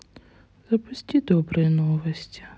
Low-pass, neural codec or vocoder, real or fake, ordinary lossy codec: none; none; real; none